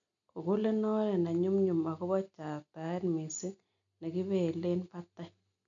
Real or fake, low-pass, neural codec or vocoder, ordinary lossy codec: real; 7.2 kHz; none; none